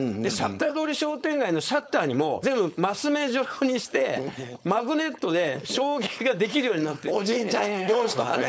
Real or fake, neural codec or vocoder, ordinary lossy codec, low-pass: fake; codec, 16 kHz, 4.8 kbps, FACodec; none; none